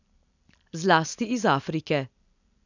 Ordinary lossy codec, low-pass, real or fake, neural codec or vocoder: none; 7.2 kHz; real; none